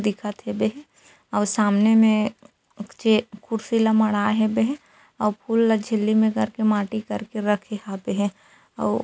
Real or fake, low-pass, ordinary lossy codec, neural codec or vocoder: real; none; none; none